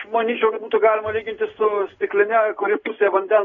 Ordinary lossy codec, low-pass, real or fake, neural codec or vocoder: AAC, 24 kbps; 7.2 kHz; real; none